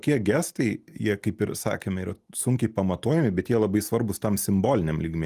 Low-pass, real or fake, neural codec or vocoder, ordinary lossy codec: 14.4 kHz; real; none; Opus, 32 kbps